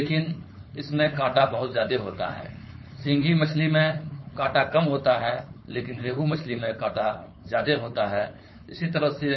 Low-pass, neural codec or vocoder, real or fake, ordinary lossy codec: 7.2 kHz; codec, 16 kHz, 4.8 kbps, FACodec; fake; MP3, 24 kbps